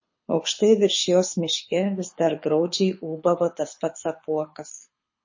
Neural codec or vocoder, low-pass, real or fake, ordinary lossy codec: codec, 24 kHz, 6 kbps, HILCodec; 7.2 kHz; fake; MP3, 32 kbps